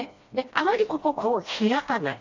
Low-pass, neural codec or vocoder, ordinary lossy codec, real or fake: 7.2 kHz; codec, 16 kHz, 1 kbps, FreqCodec, smaller model; AAC, 48 kbps; fake